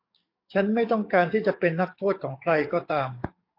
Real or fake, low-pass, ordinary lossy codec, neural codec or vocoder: fake; 5.4 kHz; AAC, 32 kbps; codec, 44.1 kHz, 7.8 kbps, DAC